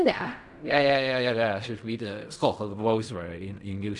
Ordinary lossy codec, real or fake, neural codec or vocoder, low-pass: none; fake; codec, 16 kHz in and 24 kHz out, 0.4 kbps, LongCat-Audio-Codec, fine tuned four codebook decoder; 10.8 kHz